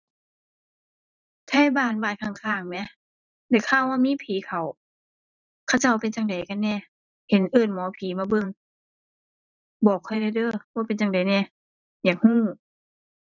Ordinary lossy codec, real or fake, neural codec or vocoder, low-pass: none; fake; vocoder, 44.1 kHz, 128 mel bands every 512 samples, BigVGAN v2; 7.2 kHz